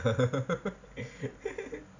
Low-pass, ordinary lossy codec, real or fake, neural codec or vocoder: 7.2 kHz; none; real; none